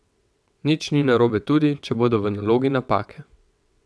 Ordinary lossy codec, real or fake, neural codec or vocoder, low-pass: none; fake; vocoder, 22.05 kHz, 80 mel bands, WaveNeXt; none